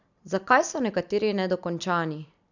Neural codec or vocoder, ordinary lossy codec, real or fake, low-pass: none; none; real; 7.2 kHz